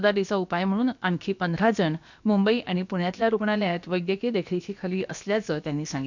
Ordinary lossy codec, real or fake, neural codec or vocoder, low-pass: none; fake; codec, 16 kHz, about 1 kbps, DyCAST, with the encoder's durations; 7.2 kHz